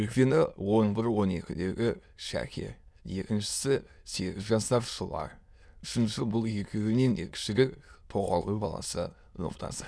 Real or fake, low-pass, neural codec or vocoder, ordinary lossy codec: fake; none; autoencoder, 22.05 kHz, a latent of 192 numbers a frame, VITS, trained on many speakers; none